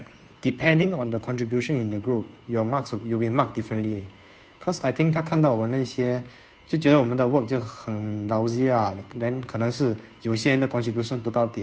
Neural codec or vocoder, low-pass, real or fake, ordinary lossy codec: codec, 16 kHz, 2 kbps, FunCodec, trained on Chinese and English, 25 frames a second; none; fake; none